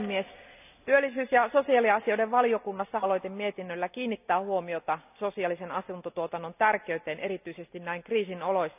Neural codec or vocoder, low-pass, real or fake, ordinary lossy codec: none; 3.6 kHz; real; none